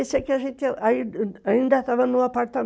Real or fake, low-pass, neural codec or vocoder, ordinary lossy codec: real; none; none; none